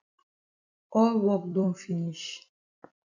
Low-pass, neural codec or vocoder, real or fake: 7.2 kHz; vocoder, 44.1 kHz, 128 mel bands every 256 samples, BigVGAN v2; fake